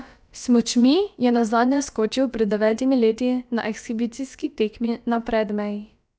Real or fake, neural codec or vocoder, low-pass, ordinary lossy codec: fake; codec, 16 kHz, about 1 kbps, DyCAST, with the encoder's durations; none; none